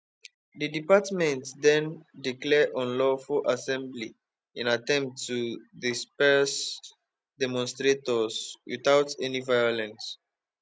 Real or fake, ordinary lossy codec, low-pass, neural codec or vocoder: real; none; none; none